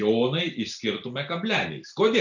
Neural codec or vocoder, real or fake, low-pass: none; real; 7.2 kHz